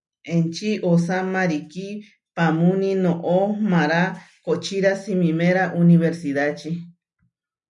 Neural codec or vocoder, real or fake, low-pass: none; real; 10.8 kHz